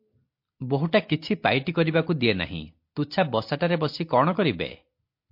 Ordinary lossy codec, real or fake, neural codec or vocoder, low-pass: MP3, 32 kbps; real; none; 5.4 kHz